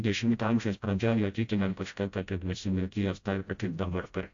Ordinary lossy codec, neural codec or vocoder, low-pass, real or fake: AAC, 64 kbps; codec, 16 kHz, 0.5 kbps, FreqCodec, smaller model; 7.2 kHz; fake